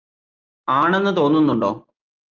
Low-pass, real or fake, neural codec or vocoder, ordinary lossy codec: 7.2 kHz; real; none; Opus, 32 kbps